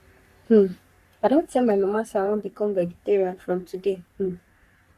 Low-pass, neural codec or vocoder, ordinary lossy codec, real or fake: 14.4 kHz; codec, 44.1 kHz, 3.4 kbps, Pupu-Codec; Opus, 64 kbps; fake